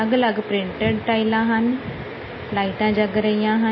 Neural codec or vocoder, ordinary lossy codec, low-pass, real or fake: none; MP3, 24 kbps; 7.2 kHz; real